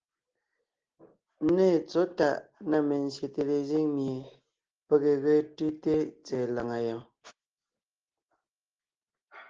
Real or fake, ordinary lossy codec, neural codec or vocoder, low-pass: real; Opus, 16 kbps; none; 7.2 kHz